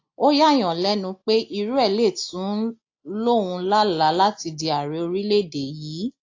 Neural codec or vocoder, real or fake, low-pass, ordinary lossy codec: none; real; 7.2 kHz; AAC, 48 kbps